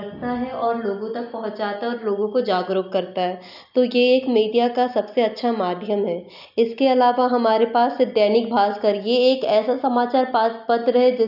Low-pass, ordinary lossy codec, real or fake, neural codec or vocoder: 5.4 kHz; none; real; none